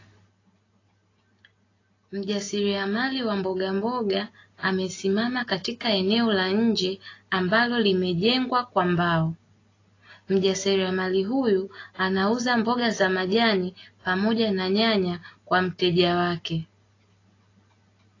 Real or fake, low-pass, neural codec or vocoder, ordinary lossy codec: real; 7.2 kHz; none; AAC, 32 kbps